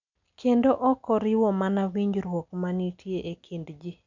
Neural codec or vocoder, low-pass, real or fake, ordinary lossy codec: none; 7.2 kHz; real; none